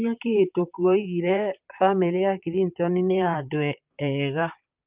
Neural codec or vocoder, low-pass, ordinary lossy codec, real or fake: vocoder, 44.1 kHz, 128 mel bands, Pupu-Vocoder; 3.6 kHz; Opus, 24 kbps; fake